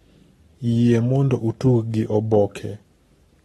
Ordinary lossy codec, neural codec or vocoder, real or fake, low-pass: AAC, 32 kbps; codec, 44.1 kHz, 7.8 kbps, Pupu-Codec; fake; 19.8 kHz